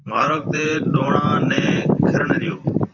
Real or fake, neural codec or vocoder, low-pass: fake; vocoder, 44.1 kHz, 128 mel bands, Pupu-Vocoder; 7.2 kHz